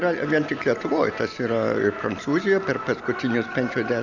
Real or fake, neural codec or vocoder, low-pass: real; none; 7.2 kHz